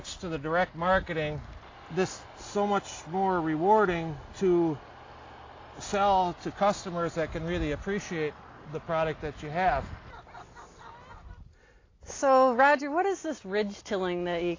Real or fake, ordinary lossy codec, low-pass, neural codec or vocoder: real; AAC, 32 kbps; 7.2 kHz; none